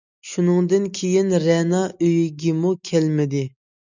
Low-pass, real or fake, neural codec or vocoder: 7.2 kHz; real; none